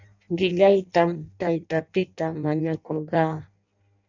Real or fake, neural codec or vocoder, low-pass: fake; codec, 16 kHz in and 24 kHz out, 0.6 kbps, FireRedTTS-2 codec; 7.2 kHz